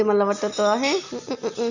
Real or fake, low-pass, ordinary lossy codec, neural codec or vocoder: real; 7.2 kHz; none; none